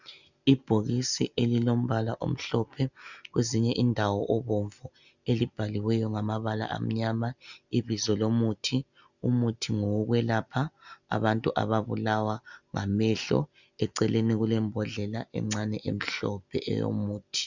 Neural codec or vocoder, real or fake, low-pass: none; real; 7.2 kHz